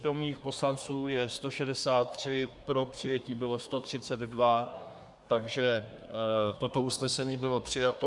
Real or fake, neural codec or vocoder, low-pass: fake; codec, 24 kHz, 1 kbps, SNAC; 10.8 kHz